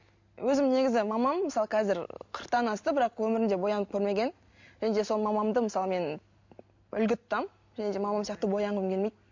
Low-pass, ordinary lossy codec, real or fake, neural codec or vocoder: 7.2 kHz; MP3, 48 kbps; real; none